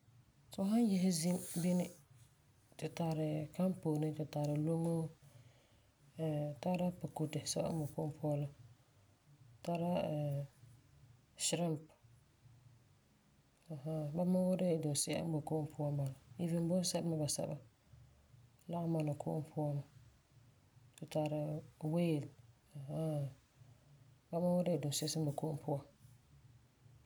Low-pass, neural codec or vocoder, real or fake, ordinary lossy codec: none; none; real; none